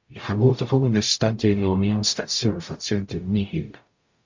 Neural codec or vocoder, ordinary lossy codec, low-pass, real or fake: codec, 44.1 kHz, 0.9 kbps, DAC; MP3, 48 kbps; 7.2 kHz; fake